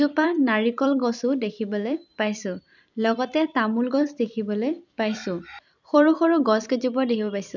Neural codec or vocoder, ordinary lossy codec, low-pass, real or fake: vocoder, 44.1 kHz, 128 mel bands every 256 samples, BigVGAN v2; none; 7.2 kHz; fake